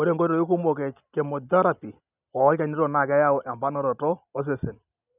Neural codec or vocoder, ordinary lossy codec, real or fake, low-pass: none; none; real; 3.6 kHz